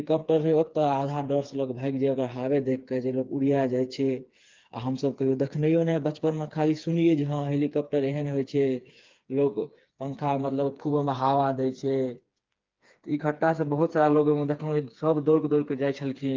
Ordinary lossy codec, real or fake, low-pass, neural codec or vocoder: Opus, 24 kbps; fake; 7.2 kHz; codec, 16 kHz, 4 kbps, FreqCodec, smaller model